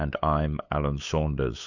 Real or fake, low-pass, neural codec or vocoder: fake; 7.2 kHz; codec, 16 kHz, 8 kbps, FunCodec, trained on LibriTTS, 25 frames a second